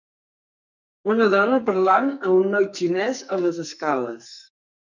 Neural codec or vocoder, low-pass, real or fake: codec, 44.1 kHz, 2.6 kbps, SNAC; 7.2 kHz; fake